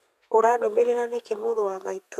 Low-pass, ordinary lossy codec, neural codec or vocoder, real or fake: 14.4 kHz; none; codec, 32 kHz, 1.9 kbps, SNAC; fake